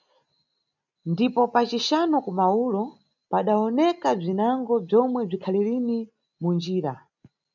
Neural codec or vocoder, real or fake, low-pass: none; real; 7.2 kHz